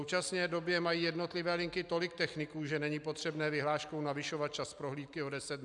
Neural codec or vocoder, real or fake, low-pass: none; real; 10.8 kHz